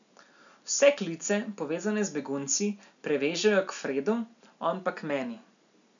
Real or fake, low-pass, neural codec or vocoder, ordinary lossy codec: real; 7.2 kHz; none; none